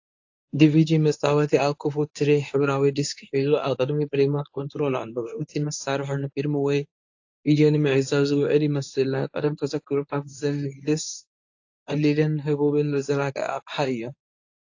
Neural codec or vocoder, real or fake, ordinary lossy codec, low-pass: codec, 24 kHz, 0.9 kbps, WavTokenizer, medium speech release version 1; fake; AAC, 48 kbps; 7.2 kHz